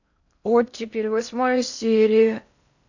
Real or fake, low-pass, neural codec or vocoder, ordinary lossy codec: fake; 7.2 kHz; codec, 16 kHz in and 24 kHz out, 0.6 kbps, FocalCodec, streaming, 4096 codes; none